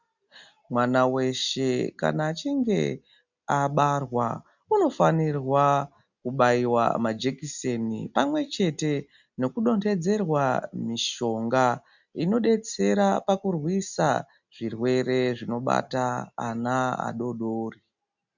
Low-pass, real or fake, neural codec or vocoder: 7.2 kHz; real; none